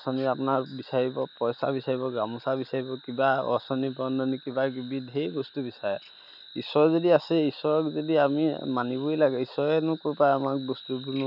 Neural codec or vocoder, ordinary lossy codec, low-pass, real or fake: none; none; 5.4 kHz; real